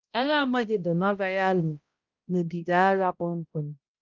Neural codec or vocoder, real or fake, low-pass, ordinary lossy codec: codec, 16 kHz, 0.5 kbps, X-Codec, HuBERT features, trained on balanced general audio; fake; 7.2 kHz; Opus, 24 kbps